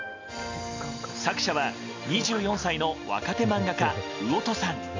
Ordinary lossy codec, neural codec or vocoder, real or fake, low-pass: MP3, 64 kbps; none; real; 7.2 kHz